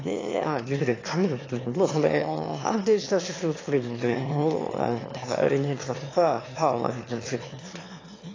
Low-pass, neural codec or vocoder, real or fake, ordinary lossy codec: 7.2 kHz; autoencoder, 22.05 kHz, a latent of 192 numbers a frame, VITS, trained on one speaker; fake; AAC, 32 kbps